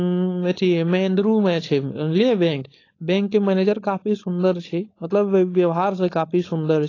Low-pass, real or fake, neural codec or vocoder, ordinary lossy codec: 7.2 kHz; fake; codec, 16 kHz, 4.8 kbps, FACodec; AAC, 32 kbps